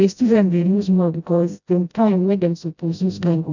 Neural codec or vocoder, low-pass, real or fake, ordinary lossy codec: codec, 16 kHz, 0.5 kbps, FreqCodec, smaller model; 7.2 kHz; fake; none